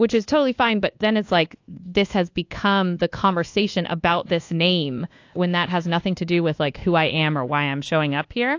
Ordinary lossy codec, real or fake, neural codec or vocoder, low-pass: AAC, 48 kbps; fake; codec, 24 kHz, 1.2 kbps, DualCodec; 7.2 kHz